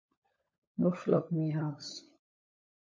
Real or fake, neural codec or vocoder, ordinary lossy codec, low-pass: fake; codec, 16 kHz, 16 kbps, FunCodec, trained on LibriTTS, 50 frames a second; MP3, 32 kbps; 7.2 kHz